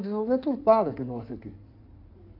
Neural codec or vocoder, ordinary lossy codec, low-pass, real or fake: codec, 16 kHz in and 24 kHz out, 2.2 kbps, FireRedTTS-2 codec; AAC, 32 kbps; 5.4 kHz; fake